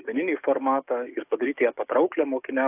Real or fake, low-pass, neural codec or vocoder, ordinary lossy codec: fake; 3.6 kHz; codec, 44.1 kHz, 7.8 kbps, DAC; AAC, 32 kbps